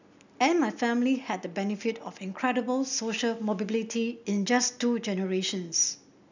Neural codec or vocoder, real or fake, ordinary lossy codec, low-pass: none; real; none; 7.2 kHz